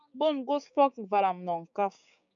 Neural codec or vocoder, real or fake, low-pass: codec, 16 kHz, 6 kbps, DAC; fake; 7.2 kHz